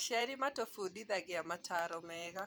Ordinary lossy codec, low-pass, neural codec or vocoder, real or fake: none; none; vocoder, 44.1 kHz, 128 mel bands every 512 samples, BigVGAN v2; fake